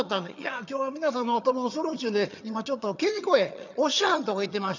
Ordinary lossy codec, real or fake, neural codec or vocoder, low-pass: none; fake; vocoder, 22.05 kHz, 80 mel bands, HiFi-GAN; 7.2 kHz